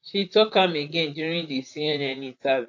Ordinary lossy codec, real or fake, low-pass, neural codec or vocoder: AAC, 48 kbps; fake; 7.2 kHz; vocoder, 44.1 kHz, 128 mel bands, Pupu-Vocoder